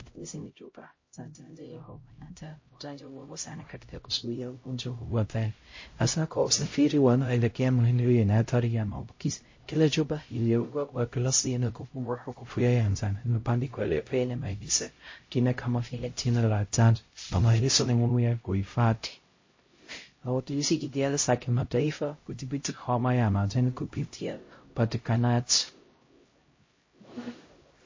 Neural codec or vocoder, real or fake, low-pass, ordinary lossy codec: codec, 16 kHz, 0.5 kbps, X-Codec, HuBERT features, trained on LibriSpeech; fake; 7.2 kHz; MP3, 32 kbps